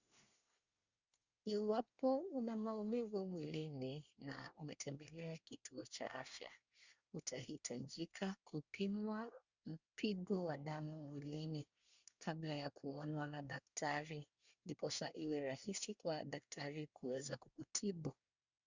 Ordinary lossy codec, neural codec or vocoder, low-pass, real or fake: Opus, 64 kbps; codec, 24 kHz, 1 kbps, SNAC; 7.2 kHz; fake